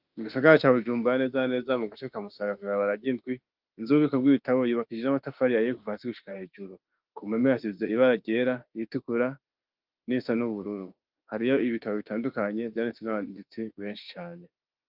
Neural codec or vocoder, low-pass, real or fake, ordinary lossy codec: autoencoder, 48 kHz, 32 numbers a frame, DAC-VAE, trained on Japanese speech; 5.4 kHz; fake; Opus, 24 kbps